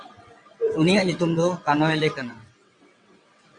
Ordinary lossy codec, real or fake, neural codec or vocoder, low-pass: Opus, 64 kbps; fake; vocoder, 22.05 kHz, 80 mel bands, WaveNeXt; 9.9 kHz